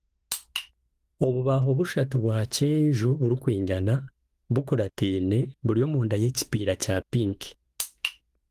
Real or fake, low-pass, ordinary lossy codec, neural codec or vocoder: fake; 14.4 kHz; Opus, 24 kbps; autoencoder, 48 kHz, 32 numbers a frame, DAC-VAE, trained on Japanese speech